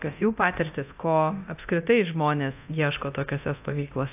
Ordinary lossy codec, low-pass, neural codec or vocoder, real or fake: AAC, 32 kbps; 3.6 kHz; codec, 24 kHz, 0.9 kbps, DualCodec; fake